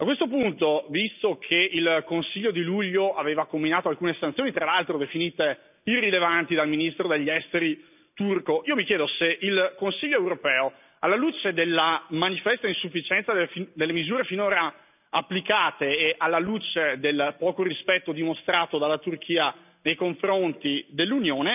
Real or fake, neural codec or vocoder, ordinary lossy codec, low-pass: real; none; none; 3.6 kHz